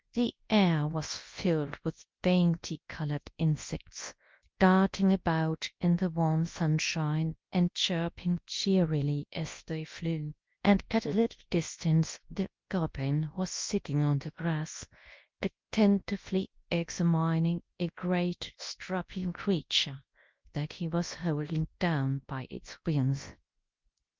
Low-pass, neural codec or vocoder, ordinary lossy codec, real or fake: 7.2 kHz; codec, 24 kHz, 0.9 kbps, WavTokenizer, large speech release; Opus, 32 kbps; fake